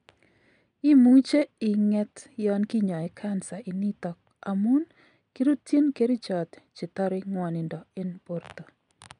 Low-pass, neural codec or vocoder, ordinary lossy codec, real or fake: 9.9 kHz; none; MP3, 96 kbps; real